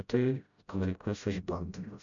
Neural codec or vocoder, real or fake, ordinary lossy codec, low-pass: codec, 16 kHz, 0.5 kbps, FreqCodec, smaller model; fake; MP3, 96 kbps; 7.2 kHz